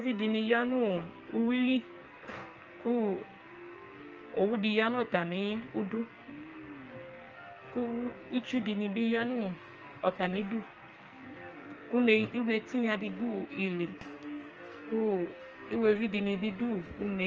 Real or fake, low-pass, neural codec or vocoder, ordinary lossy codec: fake; 7.2 kHz; codec, 44.1 kHz, 2.6 kbps, SNAC; Opus, 24 kbps